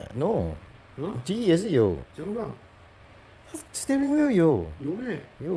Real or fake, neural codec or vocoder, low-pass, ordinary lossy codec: fake; vocoder, 22.05 kHz, 80 mel bands, Vocos; none; none